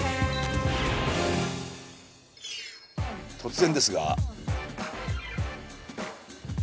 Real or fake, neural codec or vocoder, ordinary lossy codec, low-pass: real; none; none; none